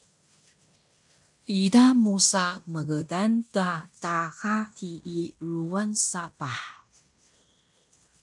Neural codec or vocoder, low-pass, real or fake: codec, 16 kHz in and 24 kHz out, 0.9 kbps, LongCat-Audio-Codec, fine tuned four codebook decoder; 10.8 kHz; fake